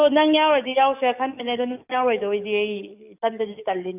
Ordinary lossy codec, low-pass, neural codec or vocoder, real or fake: none; 3.6 kHz; codec, 44.1 kHz, 7.8 kbps, DAC; fake